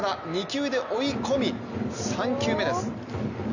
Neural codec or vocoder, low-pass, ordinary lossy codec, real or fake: none; 7.2 kHz; none; real